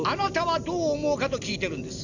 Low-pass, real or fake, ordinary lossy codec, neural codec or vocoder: 7.2 kHz; real; none; none